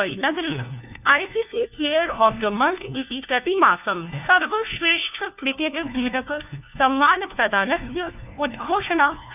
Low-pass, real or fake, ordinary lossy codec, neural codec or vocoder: 3.6 kHz; fake; none; codec, 16 kHz, 1 kbps, FunCodec, trained on LibriTTS, 50 frames a second